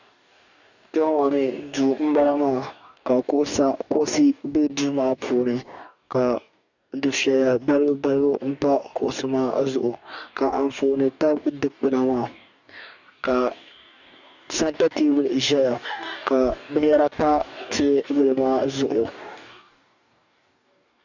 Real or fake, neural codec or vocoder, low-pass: fake; codec, 44.1 kHz, 2.6 kbps, DAC; 7.2 kHz